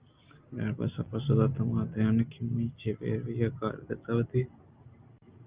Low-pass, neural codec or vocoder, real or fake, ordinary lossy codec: 3.6 kHz; none; real; Opus, 32 kbps